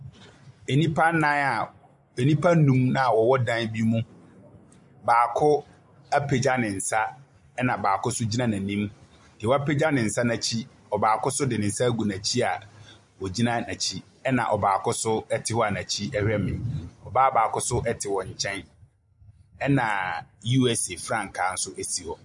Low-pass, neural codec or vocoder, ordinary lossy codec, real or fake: 10.8 kHz; none; MP3, 64 kbps; real